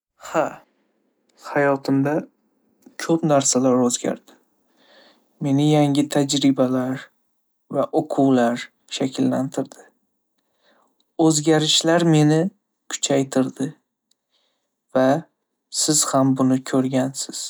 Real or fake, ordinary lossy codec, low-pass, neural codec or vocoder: real; none; none; none